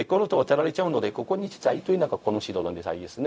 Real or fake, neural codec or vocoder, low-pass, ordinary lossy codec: fake; codec, 16 kHz, 0.4 kbps, LongCat-Audio-Codec; none; none